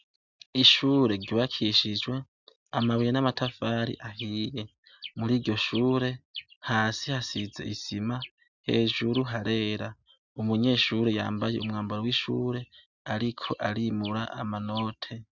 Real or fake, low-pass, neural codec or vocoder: real; 7.2 kHz; none